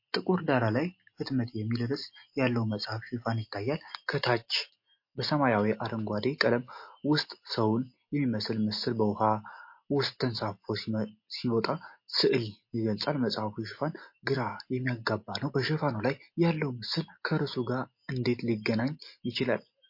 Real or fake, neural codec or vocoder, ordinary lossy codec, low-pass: real; none; MP3, 32 kbps; 5.4 kHz